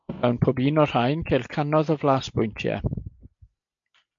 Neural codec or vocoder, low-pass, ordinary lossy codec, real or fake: none; 7.2 kHz; MP3, 48 kbps; real